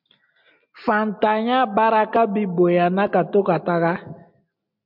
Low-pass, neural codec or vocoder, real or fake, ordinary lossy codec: 5.4 kHz; none; real; MP3, 48 kbps